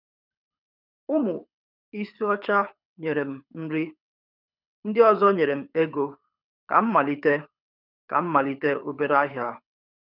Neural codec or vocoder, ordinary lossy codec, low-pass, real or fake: codec, 24 kHz, 6 kbps, HILCodec; none; 5.4 kHz; fake